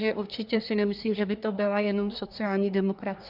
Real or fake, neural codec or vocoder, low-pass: fake; codec, 24 kHz, 1 kbps, SNAC; 5.4 kHz